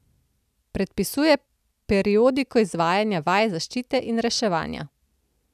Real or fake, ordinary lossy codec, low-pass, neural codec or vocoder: real; none; 14.4 kHz; none